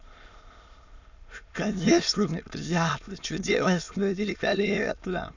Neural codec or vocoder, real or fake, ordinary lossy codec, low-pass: autoencoder, 22.05 kHz, a latent of 192 numbers a frame, VITS, trained on many speakers; fake; none; 7.2 kHz